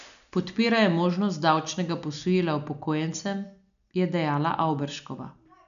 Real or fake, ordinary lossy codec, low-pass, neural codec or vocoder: real; none; 7.2 kHz; none